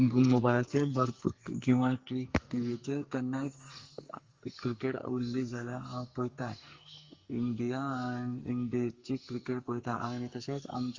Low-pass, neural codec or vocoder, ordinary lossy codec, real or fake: 7.2 kHz; codec, 44.1 kHz, 2.6 kbps, SNAC; Opus, 32 kbps; fake